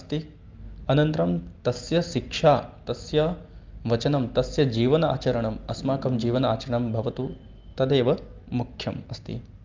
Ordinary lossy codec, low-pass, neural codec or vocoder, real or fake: Opus, 32 kbps; 7.2 kHz; none; real